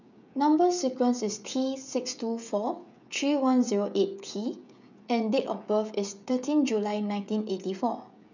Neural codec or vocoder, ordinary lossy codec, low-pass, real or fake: codec, 16 kHz, 16 kbps, FreqCodec, smaller model; none; 7.2 kHz; fake